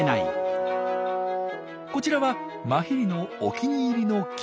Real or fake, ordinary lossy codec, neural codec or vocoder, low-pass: real; none; none; none